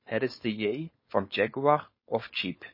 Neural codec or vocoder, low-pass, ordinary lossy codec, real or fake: codec, 16 kHz, 4.8 kbps, FACodec; 5.4 kHz; MP3, 24 kbps; fake